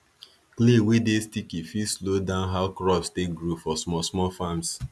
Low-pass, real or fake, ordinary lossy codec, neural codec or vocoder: none; real; none; none